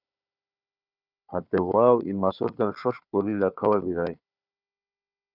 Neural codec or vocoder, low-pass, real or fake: codec, 16 kHz, 4 kbps, FunCodec, trained on Chinese and English, 50 frames a second; 5.4 kHz; fake